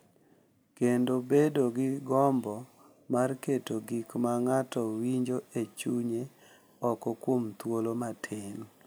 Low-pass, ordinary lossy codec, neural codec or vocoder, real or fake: none; none; none; real